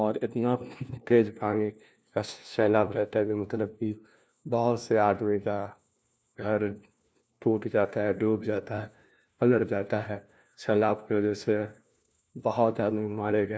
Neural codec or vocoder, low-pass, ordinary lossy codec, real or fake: codec, 16 kHz, 1 kbps, FunCodec, trained on LibriTTS, 50 frames a second; none; none; fake